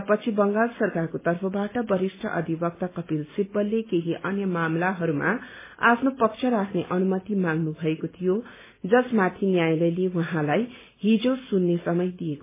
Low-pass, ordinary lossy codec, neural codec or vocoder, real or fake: 3.6 kHz; MP3, 16 kbps; none; real